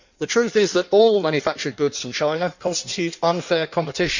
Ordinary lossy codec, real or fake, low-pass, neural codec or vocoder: none; fake; 7.2 kHz; codec, 16 kHz in and 24 kHz out, 1.1 kbps, FireRedTTS-2 codec